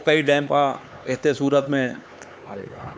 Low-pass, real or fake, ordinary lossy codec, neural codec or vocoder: none; fake; none; codec, 16 kHz, 4 kbps, X-Codec, HuBERT features, trained on LibriSpeech